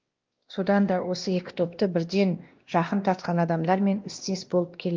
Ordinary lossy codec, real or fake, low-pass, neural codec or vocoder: Opus, 32 kbps; fake; 7.2 kHz; codec, 16 kHz, 1 kbps, X-Codec, WavLM features, trained on Multilingual LibriSpeech